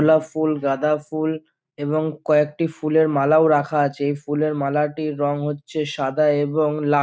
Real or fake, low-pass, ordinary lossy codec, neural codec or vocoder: real; none; none; none